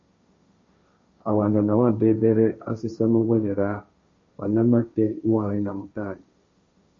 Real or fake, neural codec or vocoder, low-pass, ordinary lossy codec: fake; codec, 16 kHz, 1.1 kbps, Voila-Tokenizer; 7.2 kHz; MP3, 32 kbps